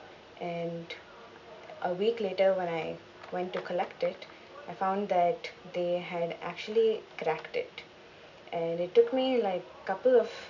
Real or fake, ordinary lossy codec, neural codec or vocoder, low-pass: real; none; none; 7.2 kHz